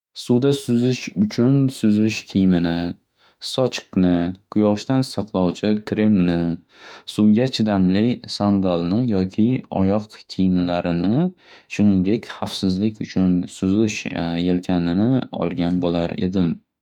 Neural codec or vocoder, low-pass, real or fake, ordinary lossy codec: autoencoder, 48 kHz, 32 numbers a frame, DAC-VAE, trained on Japanese speech; 19.8 kHz; fake; none